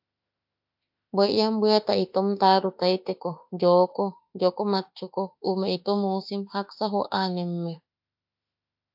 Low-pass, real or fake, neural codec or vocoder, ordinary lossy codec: 5.4 kHz; fake; autoencoder, 48 kHz, 32 numbers a frame, DAC-VAE, trained on Japanese speech; AAC, 48 kbps